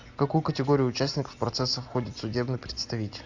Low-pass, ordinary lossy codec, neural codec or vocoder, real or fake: 7.2 kHz; AAC, 48 kbps; none; real